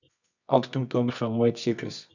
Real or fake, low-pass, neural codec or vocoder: fake; 7.2 kHz; codec, 24 kHz, 0.9 kbps, WavTokenizer, medium music audio release